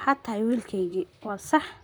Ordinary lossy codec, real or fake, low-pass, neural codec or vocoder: none; fake; none; vocoder, 44.1 kHz, 128 mel bands, Pupu-Vocoder